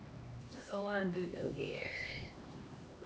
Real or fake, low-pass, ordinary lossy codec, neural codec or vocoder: fake; none; none; codec, 16 kHz, 2 kbps, X-Codec, HuBERT features, trained on LibriSpeech